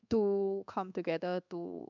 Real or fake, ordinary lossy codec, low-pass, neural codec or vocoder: fake; none; 7.2 kHz; codec, 24 kHz, 1.2 kbps, DualCodec